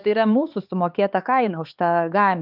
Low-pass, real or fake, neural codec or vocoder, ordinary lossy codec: 5.4 kHz; fake; codec, 16 kHz, 4 kbps, X-Codec, HuBERT features, trained on LibriSpeech; Opus, 24 kbps